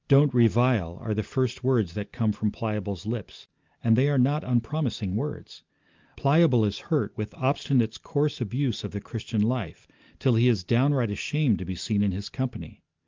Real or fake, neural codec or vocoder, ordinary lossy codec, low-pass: real; none; Opus, 24 kbps; 7.2 kHz